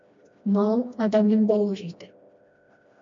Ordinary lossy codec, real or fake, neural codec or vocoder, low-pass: MP3, 48 kbps; fake; codec, 16 kHz, 1 kbps, FreqCodec, smaller model; 7.2 kHz